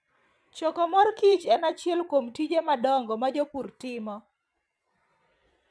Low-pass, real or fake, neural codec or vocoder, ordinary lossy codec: none; fake; vocoder, 22.05 kHz, 80 mel bands, Vocos; none